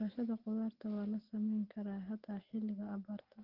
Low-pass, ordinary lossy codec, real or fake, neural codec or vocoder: 5.4 kHz; Opus, 16 kbps; real; none